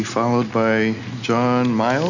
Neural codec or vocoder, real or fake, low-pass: none; real; 7.2 kHz